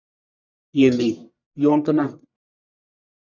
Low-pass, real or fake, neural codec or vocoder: 7.2 kHz; fake; codec, 44.1 kHz, 1.7 kbps, Pupu-Codec